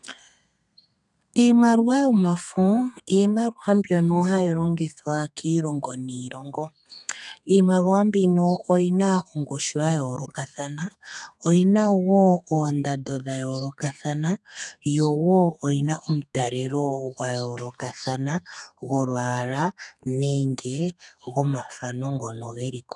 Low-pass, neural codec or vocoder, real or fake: 10.8 kHz; codec, 32 kHz, 1.9 kbps, SNAC; fake